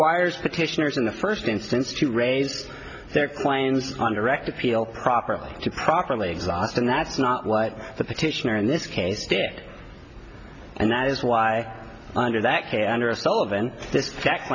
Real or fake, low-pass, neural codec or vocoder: real; 7.2 kHz; none